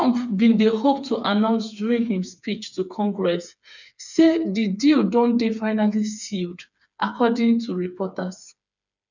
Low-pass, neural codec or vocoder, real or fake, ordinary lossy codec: 7.2 kHz; codec, 16 kHz, 4 kbps, FreqCodec, smaller model; fake; none